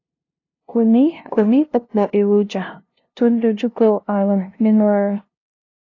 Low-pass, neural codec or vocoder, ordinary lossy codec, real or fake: 7.2 kHz; codec, 16 kHz, 0.5 kbps, FunCodec, trained on LibriTTS, 25 frames a second; AAC, 32 kbps; fake